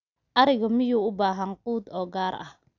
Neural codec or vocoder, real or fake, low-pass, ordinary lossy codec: none; real; 7.2 kHz; none